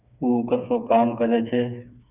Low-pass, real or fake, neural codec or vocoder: 3.6 kHz; fake; codec, 16 kHz, 4 kbps, FreqCodec, smaller model